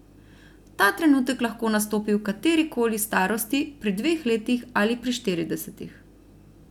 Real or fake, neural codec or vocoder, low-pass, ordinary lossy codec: real; none; 19.8 kHz; none